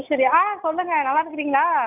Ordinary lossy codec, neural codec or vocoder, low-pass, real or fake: none; none; 3.6 kHz; real